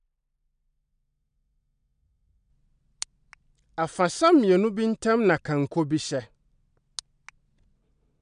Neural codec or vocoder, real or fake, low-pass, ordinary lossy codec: none; real; 9.9 kHz; none